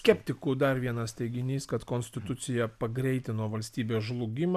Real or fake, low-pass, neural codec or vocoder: real; 14.4 kHz; none